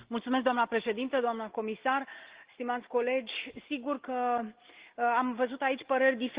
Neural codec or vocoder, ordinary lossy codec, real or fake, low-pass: none; Opus, 16 kbps; real; 3.6 kHz